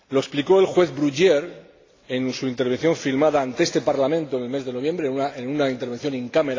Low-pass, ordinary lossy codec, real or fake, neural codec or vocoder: 7.2 kHz; AAC, 32 kbps; real; none